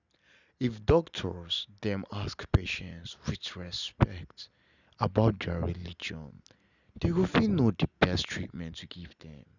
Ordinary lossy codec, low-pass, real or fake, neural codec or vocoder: none; 7.2 kHz; real; none